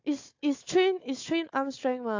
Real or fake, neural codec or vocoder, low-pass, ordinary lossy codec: fake; codec, 16 kHz, 4 kbps, FunCodec, trained on Chinese and English, 50 frames a second; 7.2 kHz; AAC, 48 kbps